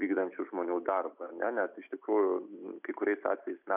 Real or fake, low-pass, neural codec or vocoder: real; 3.6 kHz; none